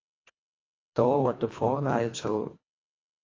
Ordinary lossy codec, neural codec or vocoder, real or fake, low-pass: AAC, 32 kbps; codec, 24 kHz, 1.5 kbps, HILCodec; fake; 7.2 kHz